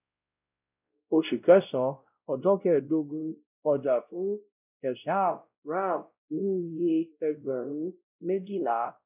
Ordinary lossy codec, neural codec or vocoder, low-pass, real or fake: none; codec, 16 kHz, 0.5 kbps, X-Codec, WavLM features, trained on Multilingual LibriSpeech; 3.6 kHz; fake